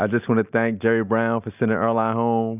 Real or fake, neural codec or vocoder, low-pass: real; none; 3.6 kHz